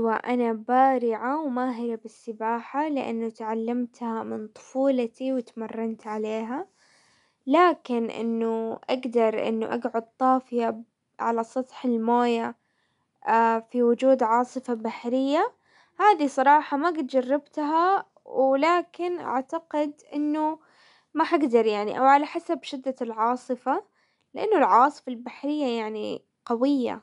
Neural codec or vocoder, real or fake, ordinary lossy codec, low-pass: none; real; none; 10.8 kHz